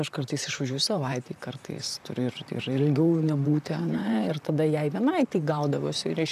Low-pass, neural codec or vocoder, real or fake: 14.4 kHz; vocoder, 44.1 kHz, 128 mel bands, Pupu-Vocoder; fake